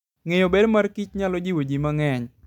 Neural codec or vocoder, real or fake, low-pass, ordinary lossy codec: none; real; 19.8 kHz; none